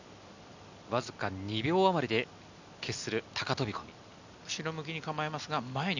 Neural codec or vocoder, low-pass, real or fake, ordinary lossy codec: none; 7.2 kHz; real; none